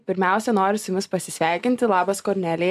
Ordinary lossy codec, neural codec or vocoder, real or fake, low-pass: AAC, 96 kbps; none; real; 14.4 kHz